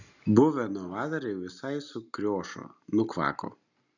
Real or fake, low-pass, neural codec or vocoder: real; 7.2 kHz; none